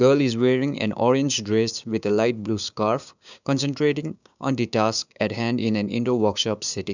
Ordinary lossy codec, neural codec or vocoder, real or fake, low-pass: none; codec, 16 kHz, 6 kbps, DAC; fake; 7.2 kHz